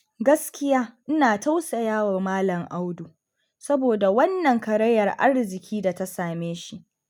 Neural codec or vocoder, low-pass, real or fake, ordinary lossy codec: none; 19.8 kHz; real; none